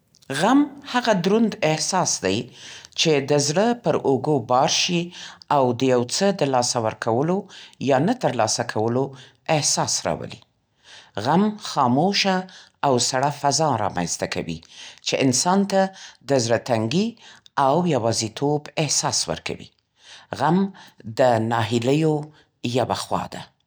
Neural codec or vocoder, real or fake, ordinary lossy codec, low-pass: none; real; none; none